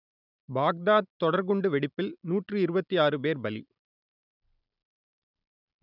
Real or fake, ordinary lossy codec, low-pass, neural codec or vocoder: real; none; 5.4 kHz; none